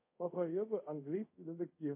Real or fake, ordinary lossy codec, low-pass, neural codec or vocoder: fake; MP3, 24 kbps; 3.6 kHz; codec, 24 kHz, 0.5 kbps, DualCodec